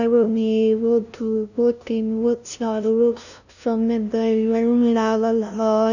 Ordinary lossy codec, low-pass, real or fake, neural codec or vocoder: none; 7.2 kHz; fake; codec, 16 kHz, 0.5 kbps, FunCodec, trained on LibriTTS, 25 frames a second